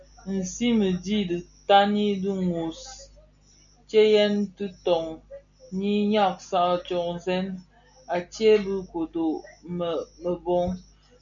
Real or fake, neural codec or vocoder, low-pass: real; none; 7.2 kHz